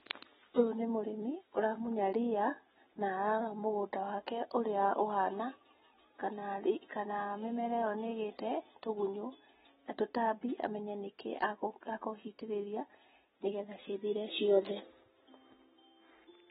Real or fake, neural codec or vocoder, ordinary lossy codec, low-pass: real; none; AAC, 16 kbps; 19.8 kHz